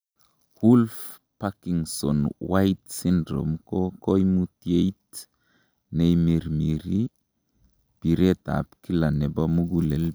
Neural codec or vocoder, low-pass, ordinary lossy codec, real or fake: none; none; none; real